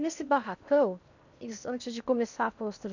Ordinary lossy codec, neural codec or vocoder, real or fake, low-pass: none; codec, 16 kHz in and 24 kHz out, 0.8 kbps, FocalCodec, streaming, 65536 codes; fake; 7.2 kHz